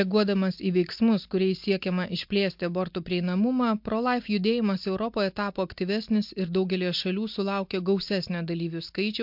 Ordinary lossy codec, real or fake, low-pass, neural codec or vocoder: MP3, 48 kbps; real; 5.4 kHz; none